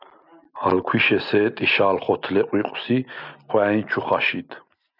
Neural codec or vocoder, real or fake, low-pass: none; real; 5.4 kHz